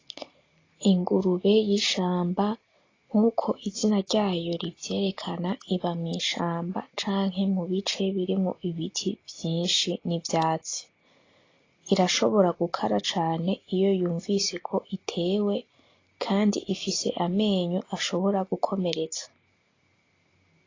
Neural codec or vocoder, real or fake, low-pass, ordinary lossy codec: none; real; 7.2 kHz; AAC, 32 kbps